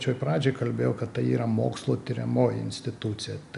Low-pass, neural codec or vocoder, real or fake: 10.8 kHz; none; real